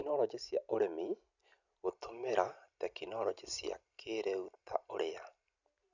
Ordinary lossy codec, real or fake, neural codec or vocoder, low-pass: none; real; none; 7.2 kHz